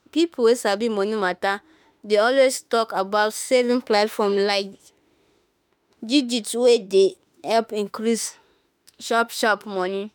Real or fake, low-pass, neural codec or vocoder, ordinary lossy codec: fake; none; autoencoder, 48 kHz, 32 numbers a frame, DAC-VAE, trained on Japanese speech; none